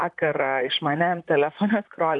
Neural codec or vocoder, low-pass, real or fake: vocoder, 44.1 kHz, 128 mel bands, Pupu-Vocoder; 10.8 kHz; fake